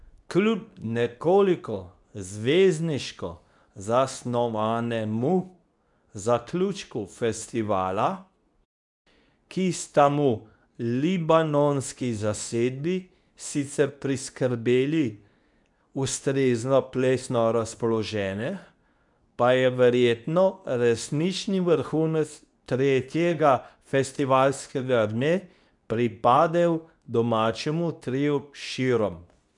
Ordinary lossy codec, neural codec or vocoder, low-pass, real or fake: none; codec, 24 kHz, 0.9 kbps, WavTokenizer, medium speech release version 2; 10.8 kHz; fake